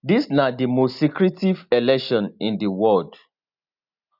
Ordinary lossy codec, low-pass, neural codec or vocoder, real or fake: none; 5.4 kHz; none; real